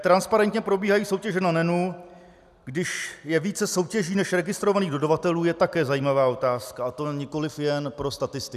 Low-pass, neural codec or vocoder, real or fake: 14.4 kHz; none; real